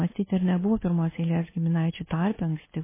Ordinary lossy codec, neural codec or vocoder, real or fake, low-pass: MP3, 16 kbps; none; real; 3.6 kHz